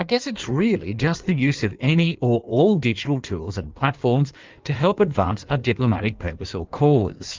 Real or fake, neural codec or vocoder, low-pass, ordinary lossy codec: fake; codec, 16 kHz in and 24 kHz out, 1.1 kbps, FireRedTTS-2 codec; 7.2 kHz; Opus, 24 kbps